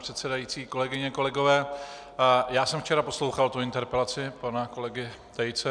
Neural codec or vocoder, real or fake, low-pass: none; real; 9.9 kHz